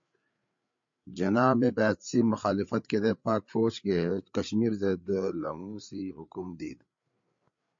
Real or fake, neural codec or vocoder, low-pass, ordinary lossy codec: fake; codec, 16 kHz, 8 kbps, FreqCodec, larger model; 7.2 kHz; MP3, 48 kbps